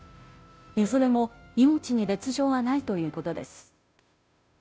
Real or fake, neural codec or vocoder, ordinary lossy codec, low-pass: fake; codec, 16 kHz, 0.5 kbps, FunCodec, trained on Chinese and English, 25 frames a second; none; none